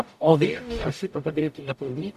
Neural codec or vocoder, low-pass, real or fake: codec, 44.1 kHz, 0.9 kbps, DAC; 14.4 kHz; fake